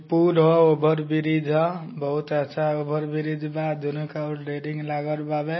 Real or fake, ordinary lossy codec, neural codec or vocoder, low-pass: real; MP3, 24 kbps; none; 7.2 kHz